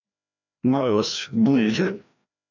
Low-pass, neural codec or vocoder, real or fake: 7.2 kHz; codec, 16 kHz, 1 kbps, FreqCodec, larger model; fake